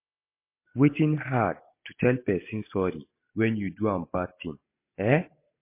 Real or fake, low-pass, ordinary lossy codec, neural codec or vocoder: real; 3.6 kHz; MP3, 24 kbps; none